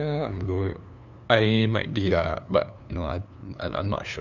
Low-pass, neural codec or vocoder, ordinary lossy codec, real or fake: 7.2 kHz; codec, 16 kHz, 2 kbps, FunCodec, trained on LibriTTS, 25 frames a second; none; fake